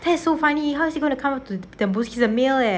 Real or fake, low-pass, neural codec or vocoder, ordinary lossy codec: real; none; none; none